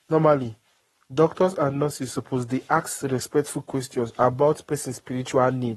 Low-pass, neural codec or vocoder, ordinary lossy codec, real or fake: 19.8 kHz; codec, 44.1 kHz, 7.8 kbps, Pupu-Codec; AAC, 32 kbps; fake